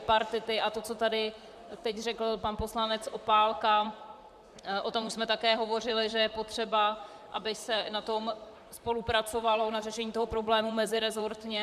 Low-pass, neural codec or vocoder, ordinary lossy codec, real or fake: 14.4 kHz; vocoder, 44.1 kHz, 128 mel bands, Pupu-Vocoder; MP3, 96 kbps; fake